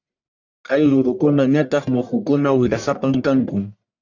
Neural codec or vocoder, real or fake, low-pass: codec, 44.1 kHz, 1.7 kbps, Pupu-Codec; fake; 7.2 kHz